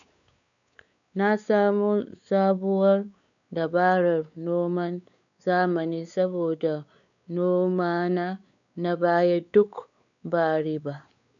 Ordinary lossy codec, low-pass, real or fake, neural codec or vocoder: none; 7.2 kHz; fake; codec, 16 kHz, 4 kbps, X-Codec, WavLM features, trained on Multilingual LibriSpeech